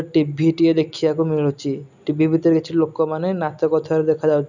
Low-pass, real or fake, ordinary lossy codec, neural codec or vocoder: 7.2 kHz; real; none; none